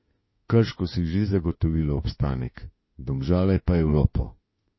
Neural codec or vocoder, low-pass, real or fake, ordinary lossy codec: autoencoder, 48 kHz, 32 numbers a frame, DAC-VAE, trained on Japanese speech; 7.2 kHz; fake; MP3, 24 kbps